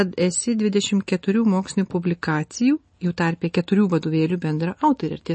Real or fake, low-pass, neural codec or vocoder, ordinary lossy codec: real; 10.8 kHz; none; MP3, 32 kbps